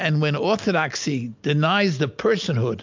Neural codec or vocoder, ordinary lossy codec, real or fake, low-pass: none; MP3, 64 kbps; real; 7.2 kHz